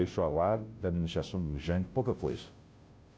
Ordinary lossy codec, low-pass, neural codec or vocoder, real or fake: none; none; codec, 16 kHz, 0.5 kbps, FunCodec, trained on Chinese and English, 25 frames a second; fake